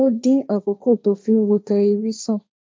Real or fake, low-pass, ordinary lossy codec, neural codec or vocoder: fake; none; none; codec, 16 kHz, 1.1 kbps, Voila-Tokenizer